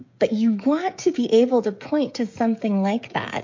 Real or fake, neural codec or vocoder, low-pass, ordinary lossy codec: fake; codec, 16 kHz, 16 kbps, FreqCodec, smaller model; 7.2 kHz; MP3, 48 kbps